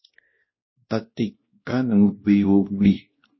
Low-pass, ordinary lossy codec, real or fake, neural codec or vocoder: 7.2 kHz; MP3, 24 kbps; fake; codec, 16 kHz, 1 kbps, X-Codec, WavLM features, trained on Multilingual LibriSpeech